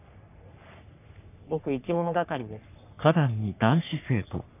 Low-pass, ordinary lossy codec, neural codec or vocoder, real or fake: 3.6 kHz; none; codec, 44.1 kHz, 3.4 kbps, Pupu-Codec; fake